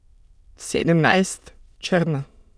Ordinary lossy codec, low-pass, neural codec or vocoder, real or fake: none; none; autoencoder, 22.05 kHz, a latent of 192 numbers a frame, VITS, trained on many speakers; fake